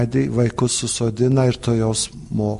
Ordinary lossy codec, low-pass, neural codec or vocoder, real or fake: MP3, 48 kbps; 14.4 kHz; none; real